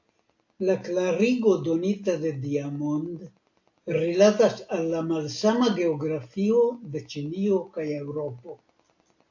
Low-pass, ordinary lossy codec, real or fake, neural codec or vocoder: 7.2 kHz; AAC, 48 kbps; real; none